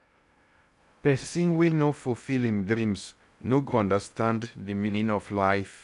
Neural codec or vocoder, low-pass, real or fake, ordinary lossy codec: codec, 16 kHz in and 24 kHz out, 0.6 kbps, FocalCodec, streaming, 2048 codes; 10.8 kHz; fake; none